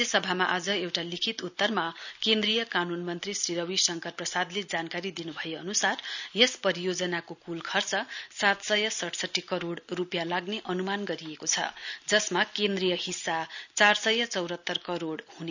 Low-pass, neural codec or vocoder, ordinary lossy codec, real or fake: 7.2 kHz; none; none; real